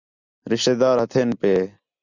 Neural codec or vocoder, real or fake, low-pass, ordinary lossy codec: none; real; 7.2 kHz; Opus, 64 kbps